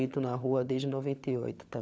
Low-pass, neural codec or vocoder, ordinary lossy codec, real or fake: none; codec, 16 kHz, 16 kbps, FunCodec, trained on LibriTTS, 50 frames a second; none; fake